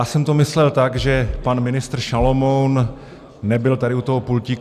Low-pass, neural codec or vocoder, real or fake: 14.4 kHz; none; real